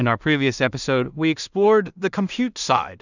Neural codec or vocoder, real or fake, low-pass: codec, 16 kHz in and 24 kHz out, 0.4 kbps, LongCat-Audio-Codec, two codebook decoder; fake; 7.2 kHz